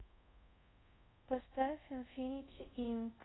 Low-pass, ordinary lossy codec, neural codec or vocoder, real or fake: 7.2 kHz; AAC, 16 kbps; codec, 24 kHz, 0.5 kbps, DualCodec; fake